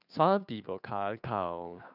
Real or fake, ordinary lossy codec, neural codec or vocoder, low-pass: fake; none; codec, 16 kHz, 2 kbps, FunCodec, trained on Chinese and English, 25 frames a second; 5.4 kHz